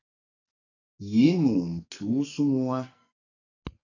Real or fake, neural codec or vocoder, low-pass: fake; codec, 44.1 kHz, 2.6 kbps, SNAC; 7.2 kHz